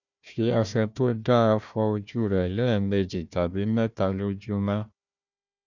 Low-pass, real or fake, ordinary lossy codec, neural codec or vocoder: 7.2 kHz; fake; none; codec, 16 kHz, 1 kbps, FunCodec, trained on Chinese and English, 50 frames a second